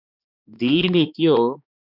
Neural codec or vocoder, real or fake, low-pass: codec, 16 kHz, 2 kbps, X-Codec, HuBERT features, trained on balanced general audio; fake; 5.4 kHz